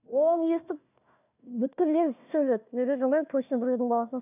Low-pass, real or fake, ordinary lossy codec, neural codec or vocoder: 3.6 kHz; fake; none; codec, 16 kHz, 1 kbps, FunCodec, trained on Chinese and English, 50 frames a second